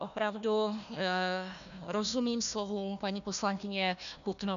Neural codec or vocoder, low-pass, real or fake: codec, 16 kHz, 1 kbps, FunCodec, trained on Chinese and English, 50 frames a second; 7.2 kHz; fake